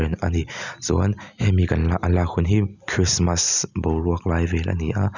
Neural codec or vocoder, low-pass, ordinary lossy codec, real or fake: none; 7.2 kHz; none; real